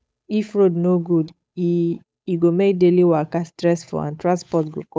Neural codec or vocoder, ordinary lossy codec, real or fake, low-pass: codec, 16 kHz, 8 kbps, FunCodec, trained on Chinese and English, 25 frames a second; none; fake; none